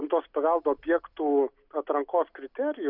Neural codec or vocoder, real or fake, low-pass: none; real; 5.4 kHz